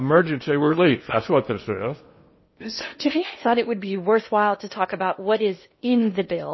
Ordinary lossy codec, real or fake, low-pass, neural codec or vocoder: MP3, 24 kbps; fake; 7.2 kHz; codec, 16 kHz in and 24 kHz out, 0.8 kbps, FocalCodec, streaming, 65536 codes